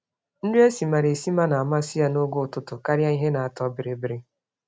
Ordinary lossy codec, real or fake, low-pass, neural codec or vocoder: none; real; none; none